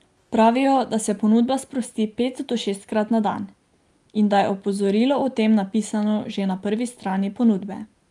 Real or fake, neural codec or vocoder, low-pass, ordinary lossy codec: real; none; 10.8 kHz; Opus, 32 kbps